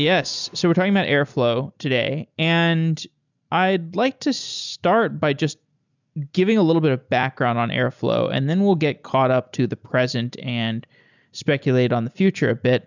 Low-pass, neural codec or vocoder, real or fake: 7.2 kHz; none; real